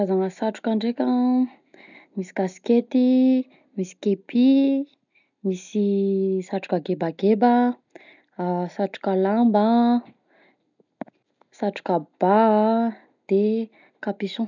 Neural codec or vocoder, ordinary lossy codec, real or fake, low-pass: none; none; real; 7.2 kHz